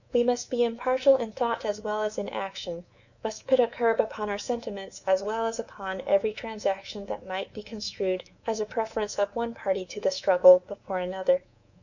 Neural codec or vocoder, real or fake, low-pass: codec, 24 kHz, 3.1 kbps, DualCodec; fake; 7.2 kHz